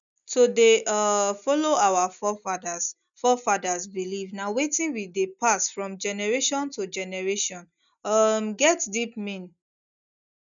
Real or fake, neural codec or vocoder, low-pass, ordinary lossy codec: real; none; 7.2 kHz; none